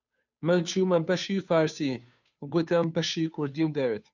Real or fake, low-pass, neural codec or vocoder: fake; 7.2 kHz; codec, 16 kHz, 2 kbps, FunCodec, trained on Chinese and English, 25 frames a second